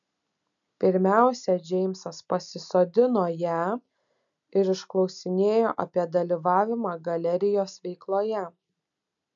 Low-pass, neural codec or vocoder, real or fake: 7.2 kHz; none; real